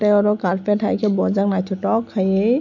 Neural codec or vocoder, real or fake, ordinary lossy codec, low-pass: none; real; none; 7.2 kHz